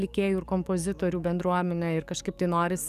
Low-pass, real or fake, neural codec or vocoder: 14.4 kHz; fake; codec, 44.1 kHz, 7.8 kbps, DAC